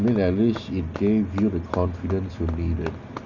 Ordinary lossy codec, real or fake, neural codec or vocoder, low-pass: none; real; none; 7.2 kHz